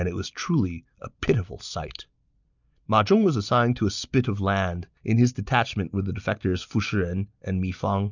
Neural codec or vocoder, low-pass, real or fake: none; 7.2 kHz; real